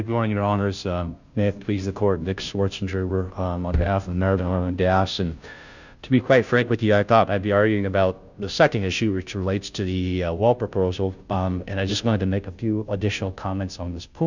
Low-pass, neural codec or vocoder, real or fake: 7.2 kHz; codec, 16 kHz, 0.5 kbps, FunCodec, trained on Chinese and English, 25 frames a second; fake